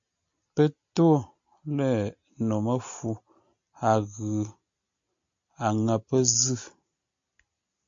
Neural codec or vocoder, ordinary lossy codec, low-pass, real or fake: none; AAC, 64 kbps; 7.2 kHz; real